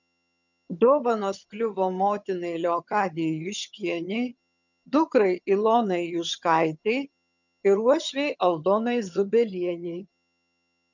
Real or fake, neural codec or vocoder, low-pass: fake; vocoder, 22.05 kHz, 80 mel bands, HiFi-GAN; 7.2 kHz